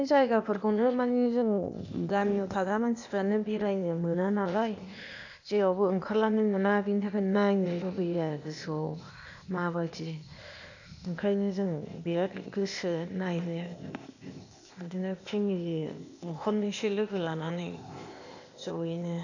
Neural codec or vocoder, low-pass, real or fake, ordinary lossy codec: codec, 16 kHz, 0.8 kbps, ZipCodec; 7.2 kHz; fake; none